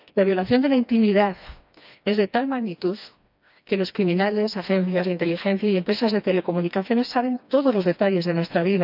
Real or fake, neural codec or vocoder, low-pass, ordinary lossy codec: fake; codec, 16 kHz, 2 kbps, FreqCodec, smaller model; 5.4 kHz; none